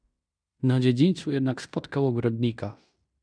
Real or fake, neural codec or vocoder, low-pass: fake; codec, 16 kHz in and 24 kHz out, 0.9 kbps, LongCat-Audio-Codec, fine tuned four codebook decoder; 9.9 kHz